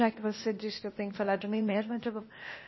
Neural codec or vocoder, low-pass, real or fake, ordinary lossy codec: codec, 16 kHz, 0.8 kbps, ZipCodec; 7.2 kHz; fake; MP3, 24 kbps